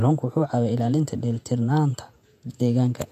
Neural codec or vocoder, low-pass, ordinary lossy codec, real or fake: vocoder, 48 kHz, 128 mel bands, Vocos; 14.4 kHz; AAC, 96 kbps; fake